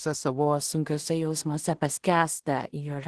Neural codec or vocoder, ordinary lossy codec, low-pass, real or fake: codec, 16 kHz in and 24 kHz out, 0.4 kbps, LongCat-Audio-Codec, two codebook decoder; Opus, 16 kbps; 10.8 kHz; fake